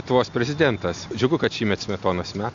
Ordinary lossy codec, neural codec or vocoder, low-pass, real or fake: AAC, 48 kbps; none; 7.2 kHz; real